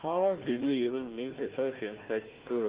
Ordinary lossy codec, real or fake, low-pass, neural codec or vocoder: Opus, 16 kbps; fake; 3.6 kHz; codec, 16 kHz, 1 kbps, FunCodec, trained on Chinese and English, 50 frames a second